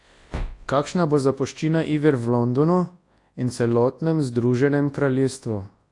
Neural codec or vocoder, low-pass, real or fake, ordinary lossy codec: codec, 24 kHz, 0.9 kbps, WavTokenizer, large speech release; 10.8 kHz; fake; AAC, 48 kbps